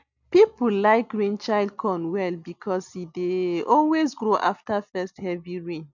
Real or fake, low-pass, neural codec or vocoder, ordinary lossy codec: real; 7.2 kHz; none; none